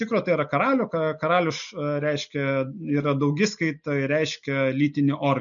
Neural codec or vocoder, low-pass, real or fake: none; 7.2 kHz; real